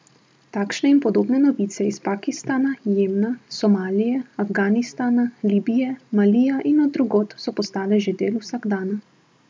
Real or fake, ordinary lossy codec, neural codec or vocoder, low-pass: real; none; none; none